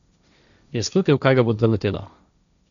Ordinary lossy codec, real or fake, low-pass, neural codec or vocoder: none; fake; 7.2 kHz; codec, 16 kHz, 1.1 kbps, Voila-Tokenizer